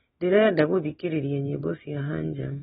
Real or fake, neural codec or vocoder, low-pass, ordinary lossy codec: real; none; 19.8 kHz; AAC, 16 kbps